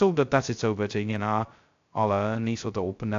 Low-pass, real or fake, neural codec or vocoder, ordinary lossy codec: 7.2 kHz; fake; codec, 16 kHz, 0.2 kbps, FocalCodec; AAC, 64 kbps